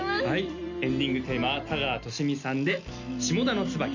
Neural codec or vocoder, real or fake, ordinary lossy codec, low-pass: none; real; none; 7.2 kHz